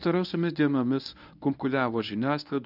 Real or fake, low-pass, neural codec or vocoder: fake; 5.4 kHz; codec, 24 kHz, 0.9 kbps, WavTokenizer, medium speech release version 1